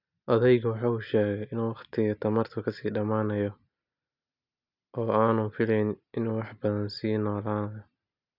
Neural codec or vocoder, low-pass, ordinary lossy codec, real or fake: none; 5.4 kHz; none; real